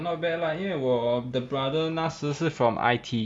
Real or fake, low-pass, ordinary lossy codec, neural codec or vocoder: real; none; none; none